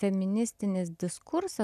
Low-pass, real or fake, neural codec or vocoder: 14.4 kHz; real; none